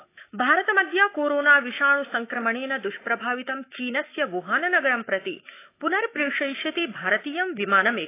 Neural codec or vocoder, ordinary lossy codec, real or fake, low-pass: autoencoder, 48 kHz, 128 numbers a frame, DAC-VAE, trained on Japanese speech; AAC, 24 kbps; fake; 3.6 kHz